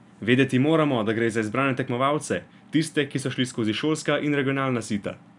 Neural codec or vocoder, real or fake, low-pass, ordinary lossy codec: none; real; 10.8 kHz; none